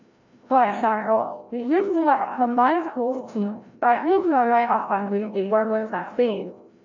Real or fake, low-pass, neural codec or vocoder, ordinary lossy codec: fake; 7.2 kHz; codec, 16 kHz, 0.5 kbps, FreqCodec, larger model; none